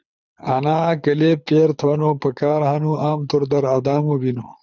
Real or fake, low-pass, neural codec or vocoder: fake; 7.2 kHz; codec, 24 kHz, 6 kbps, HILCodec